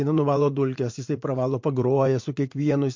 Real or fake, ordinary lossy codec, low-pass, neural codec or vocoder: fake; MP3, 48 kbps; 7.2 kHz; vocoder, 44.1 kHz, 80 mel bands, Vocos